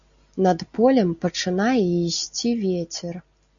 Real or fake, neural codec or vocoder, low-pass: real; none; 7.2 kHz